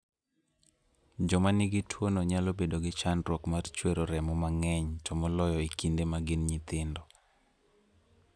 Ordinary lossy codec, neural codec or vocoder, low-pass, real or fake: none; none; none; real